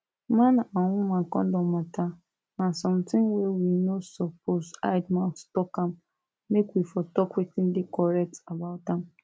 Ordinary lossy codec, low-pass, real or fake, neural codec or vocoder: none; none; real; none